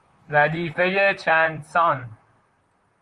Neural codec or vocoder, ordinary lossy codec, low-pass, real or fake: vocoder, 44.1 kHz, 128 mel bands, Pupu-Vocoder; Opus, 32 kbps; 10.8 kHz; fake